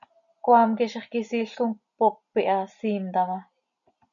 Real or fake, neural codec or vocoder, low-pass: real; none; 7.2 kHz